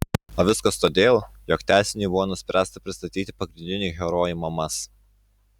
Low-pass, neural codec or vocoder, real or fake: 19.8 kHz; none; real